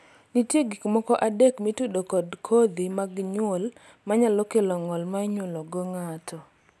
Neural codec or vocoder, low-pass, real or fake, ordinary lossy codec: none; none; real; none